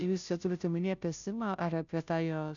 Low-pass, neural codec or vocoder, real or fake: 7.2 kHz; codec, 16 kHz, 0.5 kbps, FunCodec, trained on Chinese and English, 25 frames a second; fake